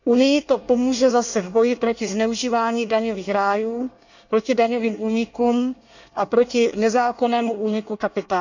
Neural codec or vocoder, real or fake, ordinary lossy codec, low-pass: codec, 24 kHz, 1 kbps, SNAC; fake; none; 7.2 kHz